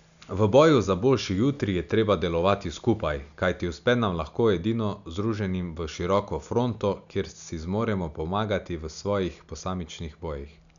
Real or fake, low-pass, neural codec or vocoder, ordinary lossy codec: real; 7.2 kHz; none; none